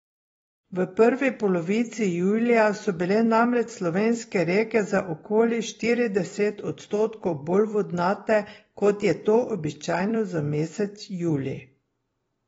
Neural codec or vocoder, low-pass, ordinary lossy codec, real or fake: vocoder, 44.1 kHz, 128 mel bands every 256 samples, BigVGAN v2; 19.8 kHz; AAC, 24 kbps; fake